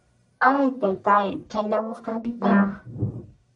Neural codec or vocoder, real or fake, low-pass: codec, 44.1 kHz, 1.7 kbps, Pupu-Codec; fake; 10.8 kHz